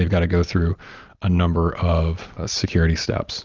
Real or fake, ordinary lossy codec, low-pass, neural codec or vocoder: real; Opus, 24 kbps; 7.2 kHz; none